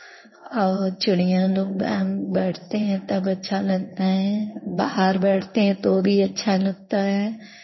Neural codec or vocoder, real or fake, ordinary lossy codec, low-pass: codec, 16 kHz in and 24 kHz out, 1 kbps, XY-Tokenizer; fake; MP3, 24 kbps; 7.2 kHz